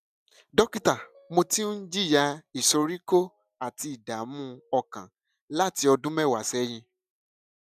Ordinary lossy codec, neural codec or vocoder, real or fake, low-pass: none; none; real; 14.4 kHz